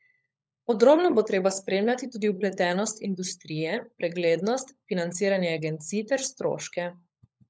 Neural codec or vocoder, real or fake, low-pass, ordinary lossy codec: codec, 16 kHz, 16 kbps, FunCodec, trained on LibriTTS, 50 frames a second; fake; none; none